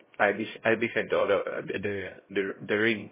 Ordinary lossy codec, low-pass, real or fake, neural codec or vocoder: MP3, 16 kbps; 3.6 kHz; fake; codec, 16 kHz, 0.5 kbps, X-Codec, HuBERT features, trained on LibriSpeech